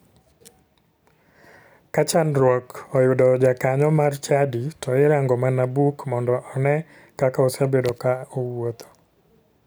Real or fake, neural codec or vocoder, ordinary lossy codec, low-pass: real; none; none; none